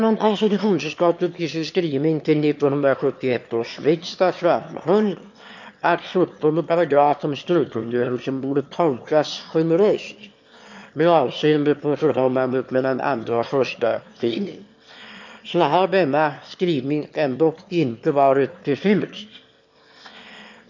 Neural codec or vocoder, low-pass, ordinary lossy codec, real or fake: autoencoder, 22.05 kHz, a latent of 192 numbers a frame, VITS, trained on one speaker; 7.2 kHz; MP3, 48 kbps; fake